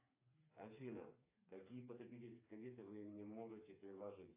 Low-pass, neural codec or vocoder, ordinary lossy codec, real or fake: 3.6 kHz; codec, 16 kHz, 4 kbps, FreqCodec, smaller model; AAC, 24 kbps; fake